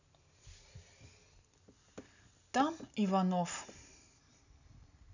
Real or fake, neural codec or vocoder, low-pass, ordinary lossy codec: real; none; 7.2 kHz; none